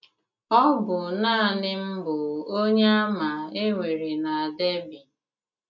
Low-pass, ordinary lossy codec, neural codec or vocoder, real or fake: 7.2 kHz; none; none; real